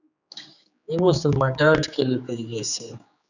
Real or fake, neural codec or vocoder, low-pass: fake; codec, 16 kHz, 4 kbps, X-Codec, HuBERT features, trained on general audio; 7.2 kHz